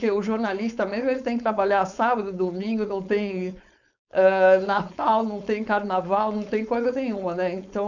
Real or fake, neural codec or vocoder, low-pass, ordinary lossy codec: fake; codec, 16 kHz, 4.8 kbps, FACodec; 7.2 kHz; none